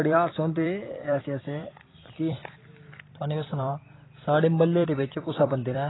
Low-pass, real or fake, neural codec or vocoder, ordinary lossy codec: 7.2 kHz; fake; vocoder, 44.1 kHz, 128 mel bands every 256 samples, BigVGAN v2; AAC, 16 kbps